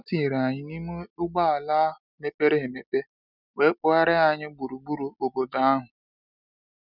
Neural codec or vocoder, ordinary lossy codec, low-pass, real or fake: none; none; 5.4 kHz; real